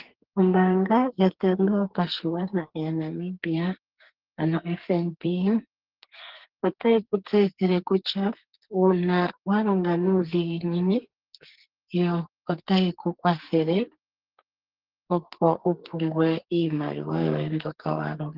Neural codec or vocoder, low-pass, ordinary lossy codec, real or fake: codec, 44.1 kHz, 2.6 kbps, SNAC; 5.4 kHz; Opus, 16 kbps; fake